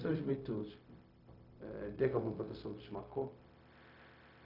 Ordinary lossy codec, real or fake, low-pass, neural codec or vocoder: none; fake; 5.4 kHz; codec, 16 kHz, 0.4 kbps, LongCat-Audio-Codec